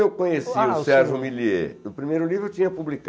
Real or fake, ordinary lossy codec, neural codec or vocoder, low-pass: real; none; none; none